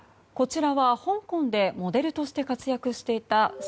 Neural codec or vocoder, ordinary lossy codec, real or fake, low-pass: none; none; real; none